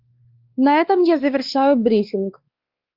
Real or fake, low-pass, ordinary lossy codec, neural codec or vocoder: fake; 5.4 kHz; Opus, 32 kbps; codec, 16 kHz, 2 kbps, X-Codec, WavLM features, trained on Multilingual LibriSpeech